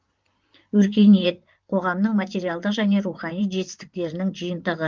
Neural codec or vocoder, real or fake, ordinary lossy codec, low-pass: vocoder, 44.1 kHz, 128 mel bands, Pupu-Vocoder; fake; Opus, 32 kbps; 7.2 kHz